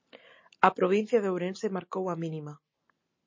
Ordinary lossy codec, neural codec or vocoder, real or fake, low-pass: MP3, 32 kbps; none; real; 7.2 kHz